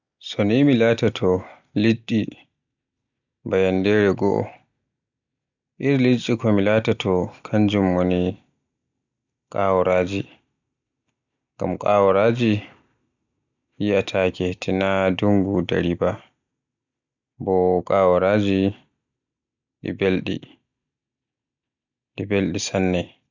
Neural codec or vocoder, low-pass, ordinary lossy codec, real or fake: none; 7.2 kHz; none; real